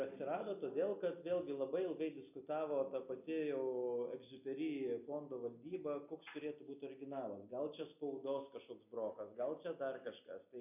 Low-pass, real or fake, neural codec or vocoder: 3.6 kHz; real; none